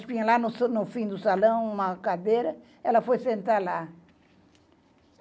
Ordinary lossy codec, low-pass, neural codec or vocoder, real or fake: none; none; none; real